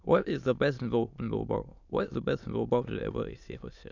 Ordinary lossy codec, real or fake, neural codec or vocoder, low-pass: none; fake; autoencoder, 22.05 kHz, a latent of 192 numbers a frame, VITS, trained on many speakers; 7.2 kHz